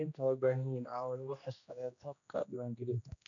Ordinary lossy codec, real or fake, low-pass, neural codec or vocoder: none; fake; 7.2 kHz; codec, 16 kHz, 1 kbps, X-Codec, HuBERT features, trained on general audio